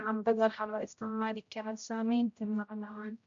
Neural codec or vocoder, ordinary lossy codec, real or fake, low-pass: codec, 16 kHz, 0.5 kbps, X-Codec, HuBERT features, trained on general audio; MP3, 48 kbps; fake; 7.2 kHz